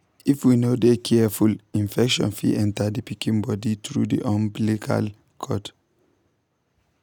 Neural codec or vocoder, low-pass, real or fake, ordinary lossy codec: none; 19.8 kHz; real; none